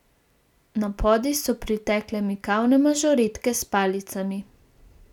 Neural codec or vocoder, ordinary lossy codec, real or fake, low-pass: none; none; real; 19.8 kHz